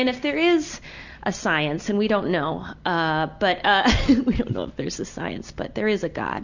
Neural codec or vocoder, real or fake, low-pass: none; real; 7.2 kHz